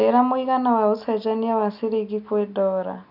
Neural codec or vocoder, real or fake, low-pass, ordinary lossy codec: none; real; 5.4 kHz; none